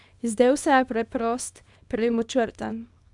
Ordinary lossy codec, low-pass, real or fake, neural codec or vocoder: none; 10.8 kHz; fake; codec, 24 kHz, 0.9 kbps, WavTokenizer, small release